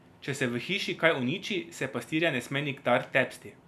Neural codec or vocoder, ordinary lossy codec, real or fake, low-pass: none; none; real; 14.4 kHz